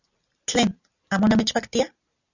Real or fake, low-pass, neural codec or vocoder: real; 7.2 kHz; none